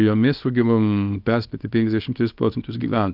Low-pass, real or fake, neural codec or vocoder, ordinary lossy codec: 5.4 kHz; fake; codec, 24 kHz, 0.9 kbps, WavTokenizer, small release; Opus, 24 kbps